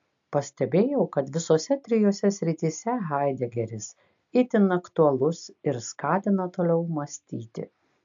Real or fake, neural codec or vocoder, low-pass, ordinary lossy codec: real; none; 7.2 kHz; AAC, 64 kbps